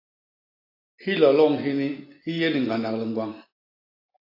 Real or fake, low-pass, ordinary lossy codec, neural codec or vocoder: real; 5.4 kHz; AAC, 32 kbps; none